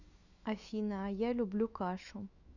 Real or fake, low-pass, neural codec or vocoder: fake; 7.2 kHz; autoencoder, 48 kHz, 128 numbers a frame, DAC-VAE, trained on Japanese speech